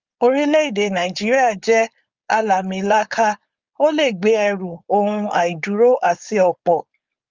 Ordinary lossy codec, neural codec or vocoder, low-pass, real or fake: Opus, 32 kbps; codec, 16 kHz, 4.8 kbps, FACodec; 7.2 kHz; fake